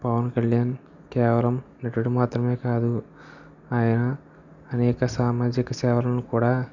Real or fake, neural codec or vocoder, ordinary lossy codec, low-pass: real; none; none; 7.2 kHz